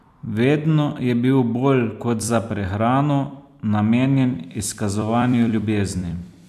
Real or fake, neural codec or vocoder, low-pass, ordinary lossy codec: fake; vocoder, 44.1 kHz, 128 mel bands every 256 samples, BigVGAN v2; 14.4 kHz; none